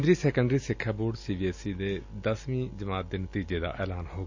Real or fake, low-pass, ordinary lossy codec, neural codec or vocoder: real; 7.2 kHz; AAC, 48 kbps; none